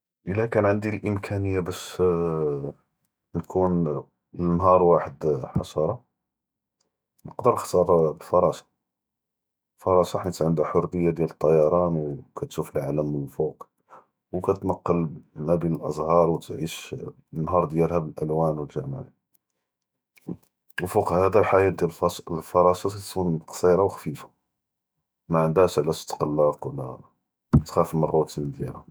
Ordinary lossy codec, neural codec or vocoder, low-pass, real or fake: none; autoencoder, 48 kHz, 128 numbers a frame, DAC-VAE, trained on Japanese speech; none; fake